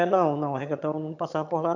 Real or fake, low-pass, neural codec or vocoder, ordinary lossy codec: fake; 7.2 kHz; vocoder, 22.05 kHz, 80 mel bands, HiFi-GAN; none